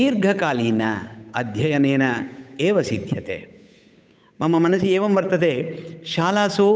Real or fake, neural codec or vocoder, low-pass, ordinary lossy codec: fake; codec, 16 kHz, 8 kbps, FunCodec, trained on Chinese and English, 25 frames a second; none; none